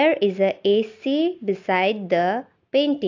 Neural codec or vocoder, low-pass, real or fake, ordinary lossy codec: none; 7.2 kHz; real; AAC, 48 kbps